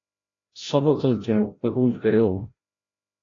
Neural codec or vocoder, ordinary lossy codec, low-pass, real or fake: codec, 16 kHz, 0.5 kbps, FreqCodec, larger model; AAC, 32 kbps; 7.2 kHz; fake